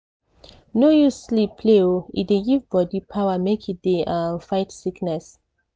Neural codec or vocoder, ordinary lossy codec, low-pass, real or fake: none; none; none; real